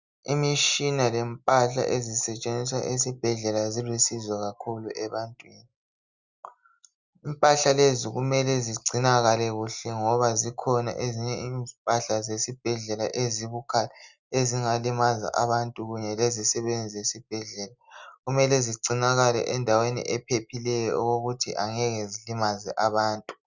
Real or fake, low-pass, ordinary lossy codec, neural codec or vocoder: real; 7.2 kHz; Opus, 64 kbps; none